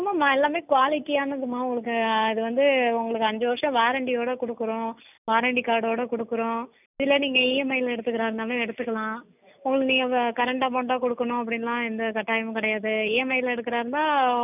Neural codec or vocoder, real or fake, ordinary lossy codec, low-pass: none; real; none; 3.6 kHz